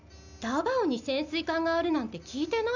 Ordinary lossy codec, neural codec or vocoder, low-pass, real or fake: none; none; 7.2 kHz; real